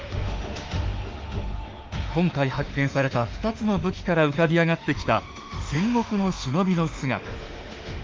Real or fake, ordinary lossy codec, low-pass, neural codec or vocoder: fake; Opus, 24 kbps; 7.2 kHz; autoencoder, 48 kHz, 32 numbers a frame, DAC-VAE, trained on Japanese speech